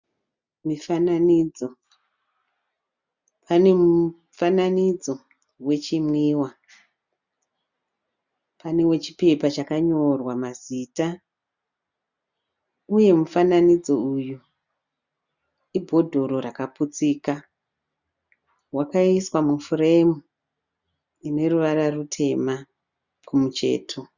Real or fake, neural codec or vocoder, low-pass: real; none; 7.2 kHz